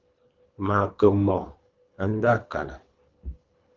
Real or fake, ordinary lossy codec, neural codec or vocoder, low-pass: fake; Opus, 32 kbps; codec, 24 kHz, 3 kbps, HILCodec; 7.2 kHz